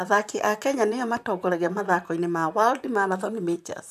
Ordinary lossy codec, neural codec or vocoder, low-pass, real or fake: none; vocoder, 44.1 kHz, 128 mel bands, Pupu-Vocoder; 14.4 kHz; fake